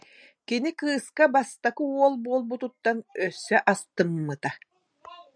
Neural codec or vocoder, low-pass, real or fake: none; 9.9 kHz; real